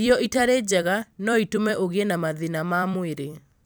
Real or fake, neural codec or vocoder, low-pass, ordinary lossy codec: fake; vocoder, 44.1 kHz, 128 mel bands every 256 samples, BigVGAN v2; none; none